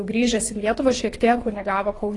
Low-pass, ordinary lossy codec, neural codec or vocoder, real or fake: 10.8 kHz; AAC, 32 kbps; codec, 24 kHz, 3 kbps, HILCodec; fake